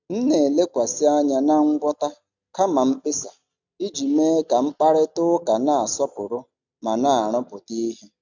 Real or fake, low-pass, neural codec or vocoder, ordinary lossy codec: real; 7.2 kHz; none; none